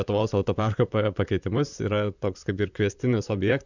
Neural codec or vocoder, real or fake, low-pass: vocoder, 44.1 kHz, 128 mel bands, Pupu-Vocoder; fake; 7.2 kHz